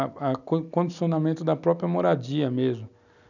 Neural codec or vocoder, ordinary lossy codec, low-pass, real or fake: none; none; 7.2 kHz; real